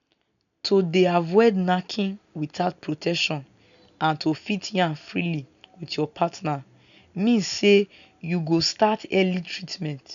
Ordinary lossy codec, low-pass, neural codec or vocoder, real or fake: none; 7.2 kHz; none; real